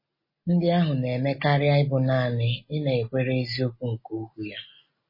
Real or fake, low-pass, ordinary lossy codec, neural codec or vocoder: real; 5.4 kHz; MP3, 24 kbps; none